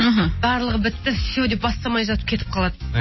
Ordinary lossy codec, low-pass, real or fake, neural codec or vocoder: MP3, 24 kbps; 7.2 kHz; real; none